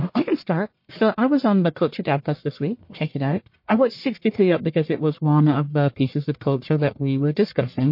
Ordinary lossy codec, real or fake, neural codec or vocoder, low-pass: MP3, 32 kbps; fake; codec, 44.1 kHz, 1.7 kbps, Pupu-Codec; 5.4 kHz